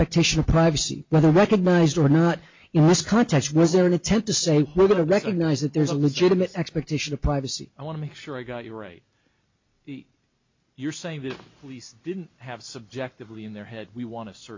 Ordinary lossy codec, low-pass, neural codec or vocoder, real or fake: MP3, 48 kbps; 7.2 kHz; none; real